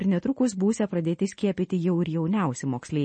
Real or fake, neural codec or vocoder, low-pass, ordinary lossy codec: real; none; 10.8 kHz; MP3, 32 kbps